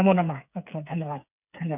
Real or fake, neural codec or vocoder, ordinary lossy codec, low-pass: fake; codec, 16 kHz, 8 kbps, FreqCodec, smaller model; none; 3.6 kHz